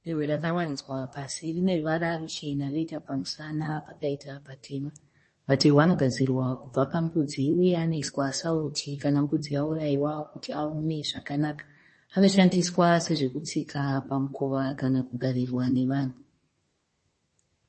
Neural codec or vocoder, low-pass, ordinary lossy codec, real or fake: codec, 24 kHz, 1 kbps, SNAC; 10.8 kHz; MP3, 32 kbps; fake